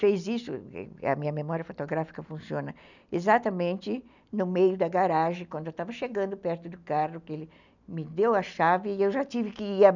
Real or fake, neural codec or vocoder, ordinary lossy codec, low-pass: real; none; none; 7.2 kHz